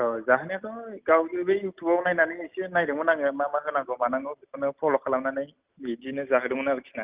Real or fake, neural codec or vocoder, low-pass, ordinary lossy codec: real; none; 3.6 kHz; Opus, 24 kbps